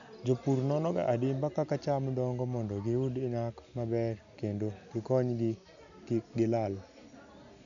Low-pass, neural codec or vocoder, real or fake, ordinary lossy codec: 7.2 kHz; none; real; none